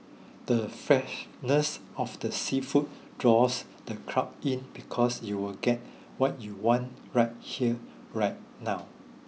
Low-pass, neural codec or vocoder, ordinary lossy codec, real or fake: none; none; none; real